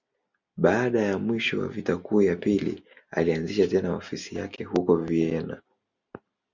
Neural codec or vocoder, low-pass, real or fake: none; 7.2 kHz; real